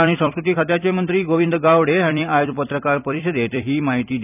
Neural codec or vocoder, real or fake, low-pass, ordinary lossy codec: vocoder, 44.1 kHz, 128 mel bands every 256 samples, BigVGAN v2; fake; 3.6 kHz; none